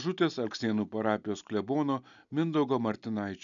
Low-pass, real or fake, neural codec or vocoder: 7.2 kHz; real; none